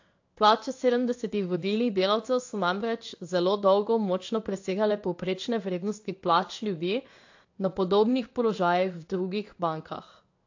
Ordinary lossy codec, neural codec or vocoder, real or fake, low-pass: AAC, 48 kbps; codec, 16 kHz in and 24 kHz out, 1 kbps, XY-Tokenizer; fake; 7.2 kHz